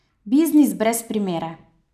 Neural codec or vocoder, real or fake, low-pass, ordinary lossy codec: none; real; 14.4 kHz; none